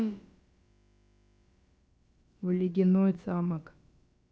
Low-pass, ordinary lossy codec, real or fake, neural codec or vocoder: none; none; fake; codec, 16 kHz, about 1 kbps, DyCAST, with the encoder's durations